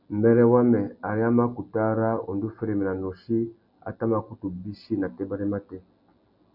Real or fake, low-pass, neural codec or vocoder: real; 5.4 kHz; none